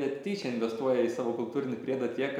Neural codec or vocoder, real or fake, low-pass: none; real; 19.8 kHz